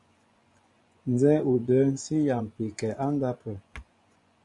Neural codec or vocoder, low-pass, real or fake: vocoder, 24 kHz, 100 mel bands, Vocos; 10.8 kHz; fake